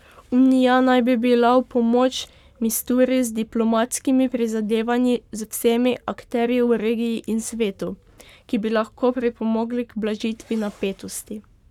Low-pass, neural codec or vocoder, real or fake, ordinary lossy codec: 19.8 kHz; codec, 44.1 kHz, 7.8 kbps, Pupu-Codec; fake; none